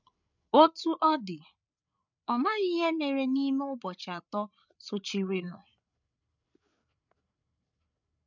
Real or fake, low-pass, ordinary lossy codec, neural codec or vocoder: fake; 7.2 kHz; none; codec, 16 kHz, 8 kbps, FreqCodec, larger model